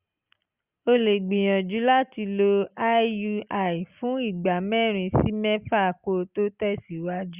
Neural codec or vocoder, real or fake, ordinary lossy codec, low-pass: none; real; Opus, 64 kbps; 3.6 kHz